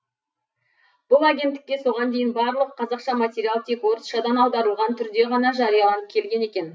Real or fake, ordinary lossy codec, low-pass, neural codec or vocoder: real; none; none; none